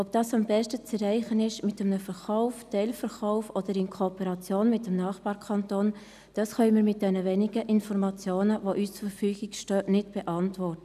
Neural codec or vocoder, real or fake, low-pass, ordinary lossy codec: none; real; 14.4 kHz; none